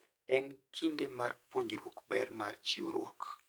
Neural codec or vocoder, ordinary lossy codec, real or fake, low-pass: codec, 44.1 kHz, 2.6 kbps, SNAC; none; fake; none